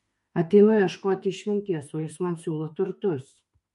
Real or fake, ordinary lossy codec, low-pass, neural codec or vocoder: fake; MP3, 48 kbps; 14.4 kHz; autoencoder, 48 kHz, 32 numbers a frame, DAC-VAE, trained on Japanese speech